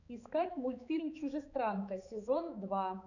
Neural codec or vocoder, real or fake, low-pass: codec, 16 kHz, 4 kbps, X-Codec, HuBERT features, trained on balanced general audio; fake; 7.2 kHz